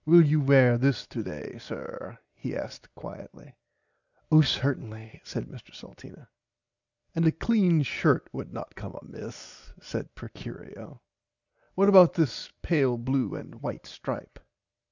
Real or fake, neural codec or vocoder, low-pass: real; none; 7.2 kHz